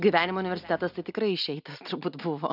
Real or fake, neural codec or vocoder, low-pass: real; none; 5.4 kHz